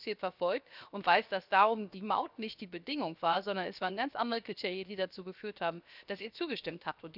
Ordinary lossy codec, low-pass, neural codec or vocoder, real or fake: none; 5.4 kHz; codec, 16 kHz, 0.7 kbps, FocalCodec; fake